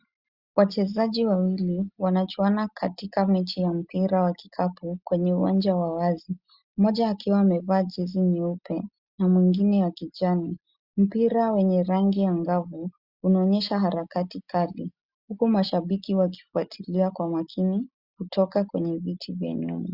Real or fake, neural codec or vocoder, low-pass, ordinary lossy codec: real; none; 5.4 kHz; Opus, 64 kbps